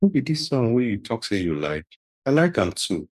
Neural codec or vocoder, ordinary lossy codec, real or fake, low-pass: codec, 44.1 kHz, 2.6 kbps, DAC; none; fake; 14.4 kHz